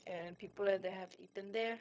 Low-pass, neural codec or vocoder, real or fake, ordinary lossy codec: none; codec, 16 kHz, 0.4 kbps, LongCat-Audio-Codec; fake; none